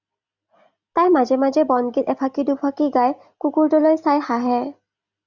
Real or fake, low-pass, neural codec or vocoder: real; 7.2 kHz; none